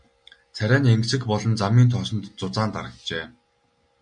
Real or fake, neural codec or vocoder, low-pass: real; none; 9.9 kHz